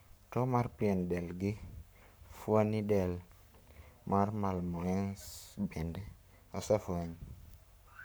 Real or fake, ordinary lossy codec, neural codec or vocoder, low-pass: fake; none; codec, 44.1 kHz, 7.8 kbps, Pupu-Codec; none